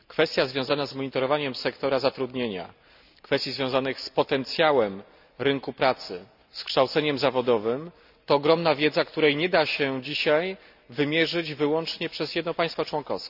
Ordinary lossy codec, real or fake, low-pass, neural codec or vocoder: none; real; 5.4 kHz; none